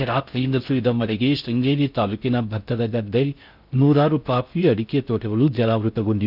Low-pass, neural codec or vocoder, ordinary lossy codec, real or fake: 5.4 kHz; codec, 16 kHz in and 24 kHz out, 0.6 kbps, FocalCodec, streaming, 4096 codes; none; fake